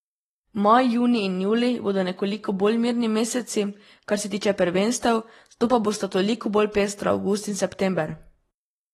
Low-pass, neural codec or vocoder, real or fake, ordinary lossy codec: 19.8 kHz; none; real; AAC, 32 kbps